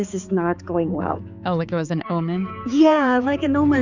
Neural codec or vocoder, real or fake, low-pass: codec, 16 kHz, 4 kbps, X-Codec, HuBERT features, trained on balanced general audio; fake; 7.2 kHz